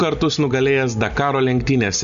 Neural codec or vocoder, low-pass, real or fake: none; 7.2 kHz; real